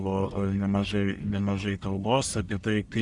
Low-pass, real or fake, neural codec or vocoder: 10.8 kHz; fake; codec, 44.1 kHz, 1.7 kbps, Pupu-Codec